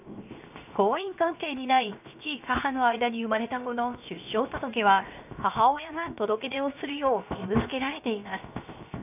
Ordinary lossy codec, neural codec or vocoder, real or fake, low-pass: none; codec, 16 kHz, 0.7 kbps, FocalCodec; fake; 3.6 kHz